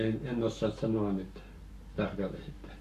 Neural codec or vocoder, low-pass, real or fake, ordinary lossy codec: codec, 44.1 kHz, 7.8 kbps, Pupu-Codec; 14.4 kHz; fake; AAC, 64 kbps